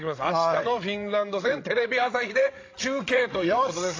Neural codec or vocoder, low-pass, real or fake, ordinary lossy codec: codec, 16 kHz, 16 kbps, FreqCodec, larger model; 7.2 kHz; fake; AAC, 32 kbps